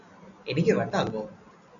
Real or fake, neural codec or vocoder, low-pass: real; none; 7.2 kHz